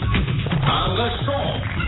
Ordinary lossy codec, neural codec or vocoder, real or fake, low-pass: AAC, 16 kbps; vocoder, 44.1 kHz, 128 mel bands, Pupu-Vocoder; fake; 7.2 kHz